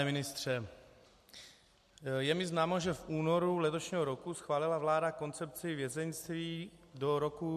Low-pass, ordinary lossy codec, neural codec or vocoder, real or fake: 14.4 kHz; MP3, 64 kbps; none; real